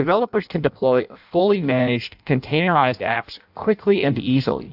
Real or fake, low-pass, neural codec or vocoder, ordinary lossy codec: fake; 5.4 kHz; codec, 16 kHz in and 24 kHz out, 0.6 kbps, FireRedTTS-2 codec; AAC, 48 kbps